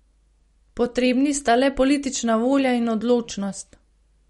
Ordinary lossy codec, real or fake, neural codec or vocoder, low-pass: MP3, 48 kbps; real; none; 19.8 kHz